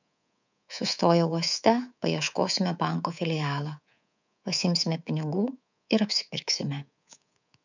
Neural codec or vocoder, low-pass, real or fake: codec, 24 kHz, 3.1 kbps, DualCodec; 7.2 kHz; fake